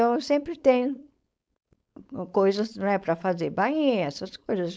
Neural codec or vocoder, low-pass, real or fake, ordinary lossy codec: codec, 16 kHz, 4.8 kbps, FACodec; none; fake; none